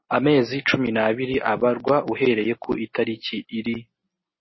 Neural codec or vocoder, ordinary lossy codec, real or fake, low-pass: none; MP3, 24 kbps; real; 7.2 kHz